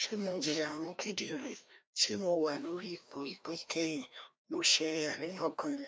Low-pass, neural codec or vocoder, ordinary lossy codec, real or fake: none; codec, 16 kHz, 1 kbps, FreqCodec, larger model; none; fake